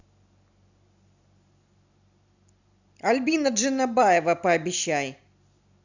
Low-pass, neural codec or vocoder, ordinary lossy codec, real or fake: 7.2 kHz; none; none; real